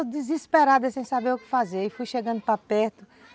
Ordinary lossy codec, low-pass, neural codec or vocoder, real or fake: none; none; none; real